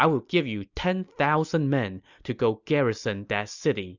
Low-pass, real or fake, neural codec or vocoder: 7.2 kHz; real; none